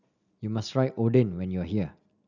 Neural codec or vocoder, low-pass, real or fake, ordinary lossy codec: none; 7.2 kHz; real; none